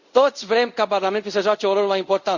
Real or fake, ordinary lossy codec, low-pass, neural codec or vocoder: fake; Opus, 64 kbps; 7.2 kHz; codec, 24 kHz, 0.5 kbps, DualCodec